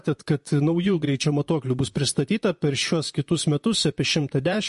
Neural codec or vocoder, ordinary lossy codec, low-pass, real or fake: vocoder, 44.1 kHz, 128 mel bands, Pupu-Vocoder; MP3, 48 kbps; 14.4 kHz; fake